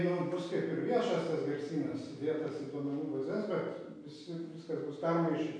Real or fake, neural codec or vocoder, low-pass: real; none; 9.9 kHz